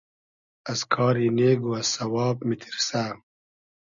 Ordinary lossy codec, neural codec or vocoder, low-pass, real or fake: Opus, 64 kbps; none; 7.2 kHz; real